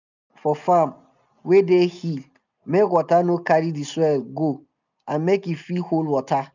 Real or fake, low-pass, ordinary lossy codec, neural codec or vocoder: real; 7.2 kHz; none; none